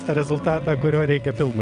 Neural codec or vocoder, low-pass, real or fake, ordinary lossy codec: vocoder, 22.05 kHz, 80 mel bands, WaveNeXt; 9.9 kHz; fake; AAC, 96 kbps